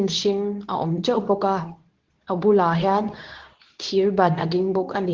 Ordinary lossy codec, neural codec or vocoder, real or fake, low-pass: Opus, 16 kbps; codec, 24 kHz, 0.9 kbps, WavTokenizer, medium speech release version 2; fake; 7.2 kHz